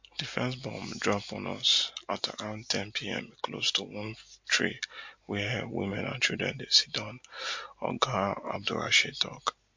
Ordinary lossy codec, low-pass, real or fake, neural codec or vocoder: MP3, 48 kbps; 7.2 kHz; real; none